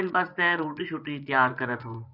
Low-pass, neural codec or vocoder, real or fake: 5.4 kHz; vocoder, 24 kHz, 100 mel bands, Vocos; fake